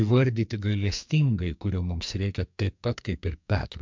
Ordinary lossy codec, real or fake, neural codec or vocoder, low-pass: MP3, 64 kbps; fake; codec, 44.1 kHz, 2.6 kbps, SNAC; 7.2 kHz